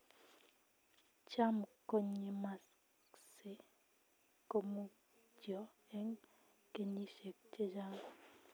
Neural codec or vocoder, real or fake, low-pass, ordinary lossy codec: none; real; none; none